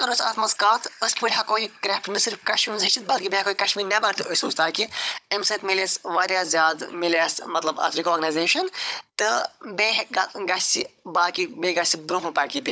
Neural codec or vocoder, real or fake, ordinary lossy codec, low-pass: codec, 16 kHz, 16 kbps, FunCodec, trained on Chinese and English, 50 frames a second; fake; none; none